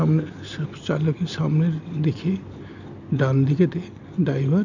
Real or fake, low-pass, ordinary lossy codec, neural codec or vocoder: real; 7.2 kHz; none; none